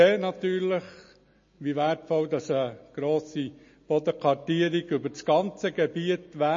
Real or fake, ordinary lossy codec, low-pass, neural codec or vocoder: real; MP3, 32 kbps; 7.2 kHz; none